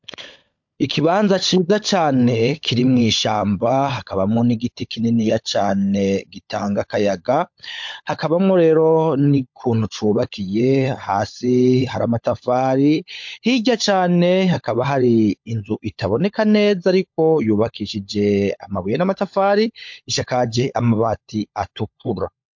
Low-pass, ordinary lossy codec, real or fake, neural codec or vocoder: 7.2 kHz; MP3, 48 kbps; fake; codec, 16 kHz, 16 kbps, FunCodec, trained on LibriTTS, 50 frames a second